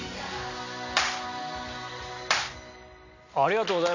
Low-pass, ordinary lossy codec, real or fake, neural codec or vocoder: 7.2 kHz; none; real; none